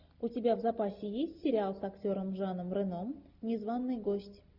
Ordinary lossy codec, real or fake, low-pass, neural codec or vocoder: Opus, 64 kbps; real; 5.4 kHz; none